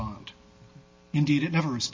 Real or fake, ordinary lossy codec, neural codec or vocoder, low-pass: real; MP3, 32 kbps; none; 7.2 kHz